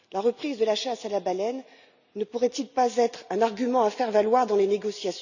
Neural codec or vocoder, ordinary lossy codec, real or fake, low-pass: none; none; real; 7.2 kHz